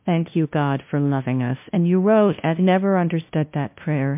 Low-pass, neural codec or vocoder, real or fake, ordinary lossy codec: 3.6 kHz; codec, 16 kHz, 0.5 kbps, FunCodec, trained on LibriTTS, 25 frames a second; fake; MP3, 32 kbps